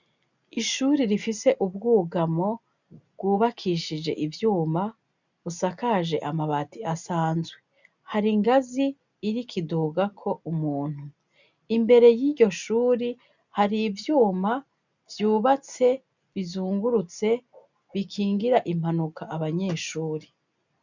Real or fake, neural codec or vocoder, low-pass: real; none; 7.2 kHz